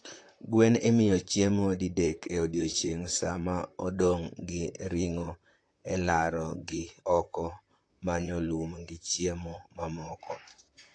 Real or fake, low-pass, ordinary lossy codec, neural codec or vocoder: fake; 9.9 kHz; AAC, 48 kbps; vocoder, 44.1 kHz, 128 mel bands, Pupu-Vocoder